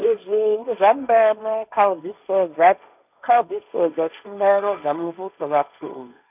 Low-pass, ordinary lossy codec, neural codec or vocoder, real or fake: 3.6 kHz; none; codec, 16 kHz, 1.1 kbps, Voila-Tokenizer; fake